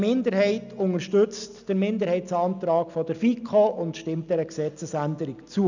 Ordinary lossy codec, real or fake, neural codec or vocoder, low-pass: none; real; none; 7.2 kHz